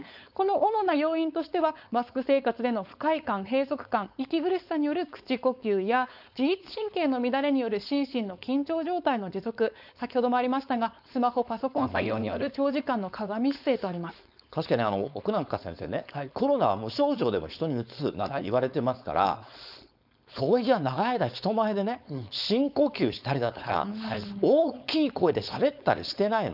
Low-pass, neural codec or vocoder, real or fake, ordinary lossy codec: 5.4 kHz; codec, 16 kHz, 4.8 kbps, FACodec; fake; none